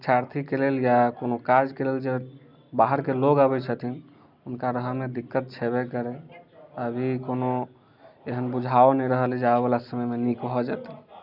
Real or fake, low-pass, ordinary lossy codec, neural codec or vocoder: real; 5.4 kHz; none; none